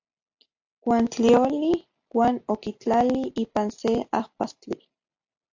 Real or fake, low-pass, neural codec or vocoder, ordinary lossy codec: real; 7.2 kHz; none; AAC, 48 kbps